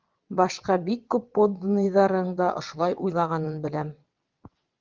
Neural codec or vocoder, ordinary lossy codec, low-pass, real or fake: vocoder, 22.05 kHz, 80 mel bands, WaveNeXt; Opus, 32 kbps; 7.2 kHz; fake